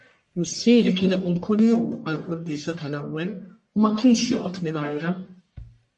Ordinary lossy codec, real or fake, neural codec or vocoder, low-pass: MP3, 48 kbps; fake; codec, 44.1 kHz, 1.7 kbps, Pupu-Codec; 10.8 kHz